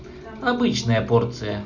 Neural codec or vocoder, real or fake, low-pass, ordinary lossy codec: none; real; 7.2 kHz; none